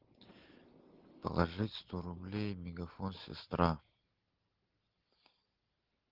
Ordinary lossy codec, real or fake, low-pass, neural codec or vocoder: Opus, 16 kbps; real; 5.4 kHz; none